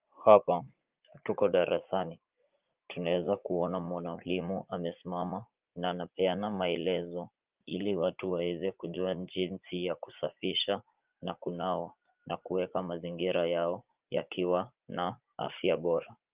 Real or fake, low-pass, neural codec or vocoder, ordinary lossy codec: fake; 3.6 kHz; vocoder, 44.1 kHz, 80 mel bands, Vocos; Opus, 24 kbps